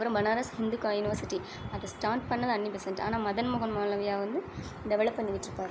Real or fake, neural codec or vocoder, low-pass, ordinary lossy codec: real; none; none; none